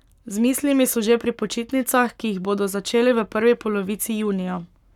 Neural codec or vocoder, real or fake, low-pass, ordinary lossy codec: codec, 44.1 kHz, 7.8 kbps, Pupu-Codec; fake; 19.8 kHz; none